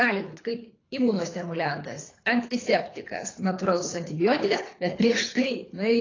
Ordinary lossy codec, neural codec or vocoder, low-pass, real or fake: AAC, 32 kbps; codec, 24 kHz, 3 kbps, HILCodec; 7.2 kHz; fake